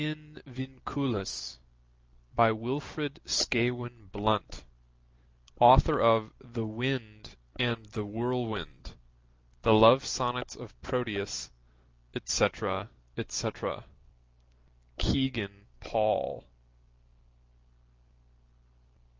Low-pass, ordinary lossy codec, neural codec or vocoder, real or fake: 7.2 kHz; Opus, 24 kbps; none; real